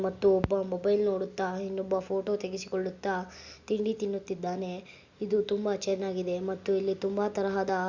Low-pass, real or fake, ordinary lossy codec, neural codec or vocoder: 7.2 kHz; real; Opus, 64 kbps; none